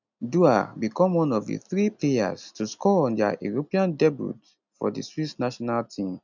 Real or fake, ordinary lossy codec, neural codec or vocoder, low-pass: real; none; none; 7.2 kHz